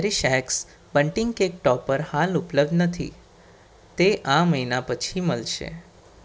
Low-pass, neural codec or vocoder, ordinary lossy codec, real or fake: none; none; none; real